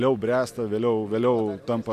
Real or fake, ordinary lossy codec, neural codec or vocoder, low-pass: real; MP3, 96 kbps; none; 14.4 kHz